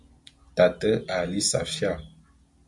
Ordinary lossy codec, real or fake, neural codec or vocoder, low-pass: MP3, 64 kbps; real; none; 10.8 kHz